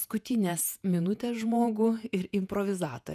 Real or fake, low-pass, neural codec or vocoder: fake; 14.4 kHz; vocoder, 48 kHz, 128 mel bands, Vocos